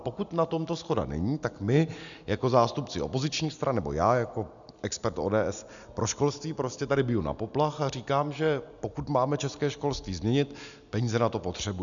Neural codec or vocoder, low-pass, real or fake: none; 7.2 kHz; real